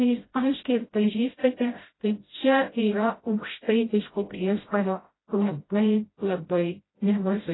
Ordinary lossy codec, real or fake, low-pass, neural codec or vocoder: AAC, 16 kbps; fake; 7.2 kHz; codec, 16 kHz, 0.5 kbps, FreqCodec, smaller model